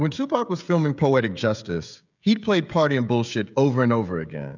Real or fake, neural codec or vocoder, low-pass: fake; codec, 16 kHz, 16 kbps, FreqCodec, smaller model; 7.2 kHz